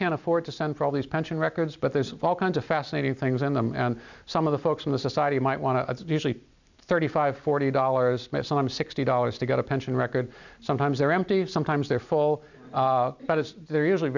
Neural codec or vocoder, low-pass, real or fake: none; 7.2 kHz; real